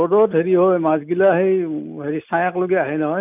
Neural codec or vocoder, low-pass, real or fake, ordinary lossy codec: none; 3.6 kHz; real; none